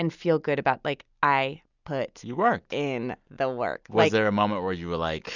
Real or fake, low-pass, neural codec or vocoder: real; 7.2 kHz; none